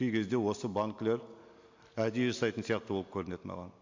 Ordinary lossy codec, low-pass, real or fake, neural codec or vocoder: MP3, 48 kbps; 7.2 kHz; real; none